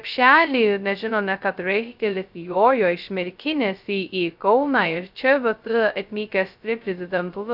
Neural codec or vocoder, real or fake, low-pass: codec, 16 kHz, 0.2 kbps, FocalCodec; fake; 5.4 kHz